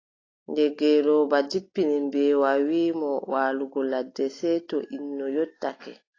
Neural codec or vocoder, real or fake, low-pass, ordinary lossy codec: none; real; 7.2 kHz; AAC, 32 kbps